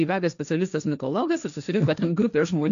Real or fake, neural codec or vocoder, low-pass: fake; codec, 16 kHz, 1.1 kbps, Voila-Tokenizer; 7.2 kHz